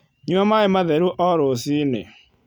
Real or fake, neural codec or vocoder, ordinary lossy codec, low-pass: real; none; none; 19.8 kHz